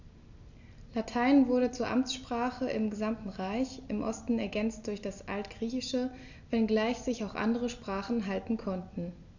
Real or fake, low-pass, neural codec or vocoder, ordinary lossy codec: real; 7.2 kHz; none; none